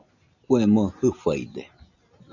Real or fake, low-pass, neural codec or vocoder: real; 7.2 kHz; none